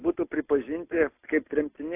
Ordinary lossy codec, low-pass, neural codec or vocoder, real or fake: AAC, 24 kbps; 3.6 kHz; none; real